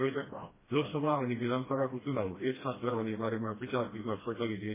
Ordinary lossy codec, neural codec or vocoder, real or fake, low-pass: MP3, 16 kbps; codec, 16 kHz, 1 kbps, FreqCodec, smaller model; fake; 3.6 kHz